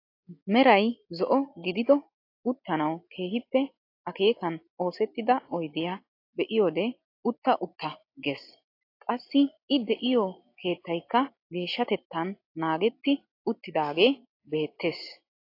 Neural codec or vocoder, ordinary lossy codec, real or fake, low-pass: none; AAC, 32 kbps; real; 5.4 kHz